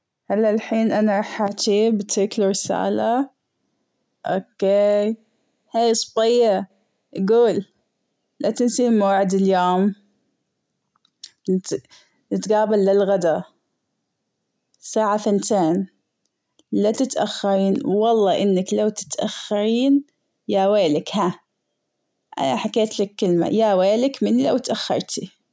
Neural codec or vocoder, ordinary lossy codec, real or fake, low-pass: none; none; real; none